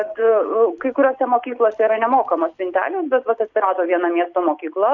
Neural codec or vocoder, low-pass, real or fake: none; 7.2 kHz; real